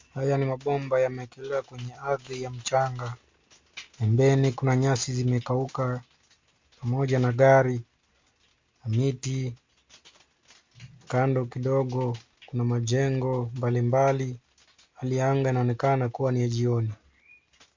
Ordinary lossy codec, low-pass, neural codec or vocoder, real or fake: MP3, 48 kbps; 7.2 kHz; none; real